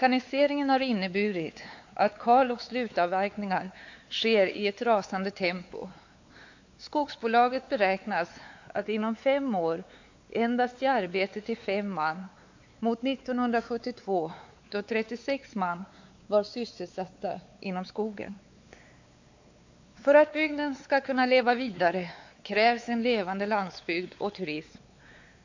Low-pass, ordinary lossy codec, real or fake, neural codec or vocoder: 7.2 kHz; AAC, 48 kbps; fake; codec, 16 kHz, 4 kbps, X-Codec, WavLM features, trained on Multilingual LibriSpeech